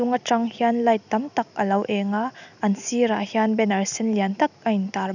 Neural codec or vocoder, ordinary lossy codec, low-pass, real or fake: none; none; 7.2 kHz; real